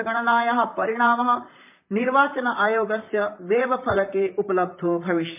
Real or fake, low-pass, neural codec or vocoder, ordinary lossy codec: fake; 3.6 kHz; vocoder, 44.1 kHz, 128 mel bands, Pupu-Vocoder; MP3, 32 kbps